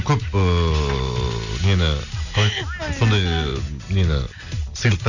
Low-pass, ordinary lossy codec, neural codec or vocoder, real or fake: 7.2 kHz; none; none; real